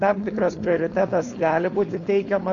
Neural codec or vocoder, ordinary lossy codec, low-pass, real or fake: codec, 16 kHz, 4.8 kbps, FACodec; MP3, 96 kbps; 7.2 kHz; fake